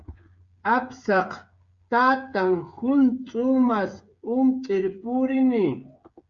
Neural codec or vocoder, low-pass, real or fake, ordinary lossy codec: codec, 16 kHz, 8 kbps, FreqCodec, smaller model; 7.2 kHz; fake; Opus, 64 kbps